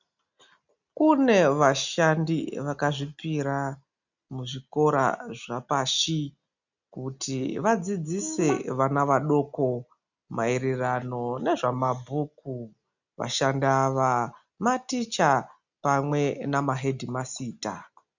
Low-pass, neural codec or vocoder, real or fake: 7.2 kHz; none; real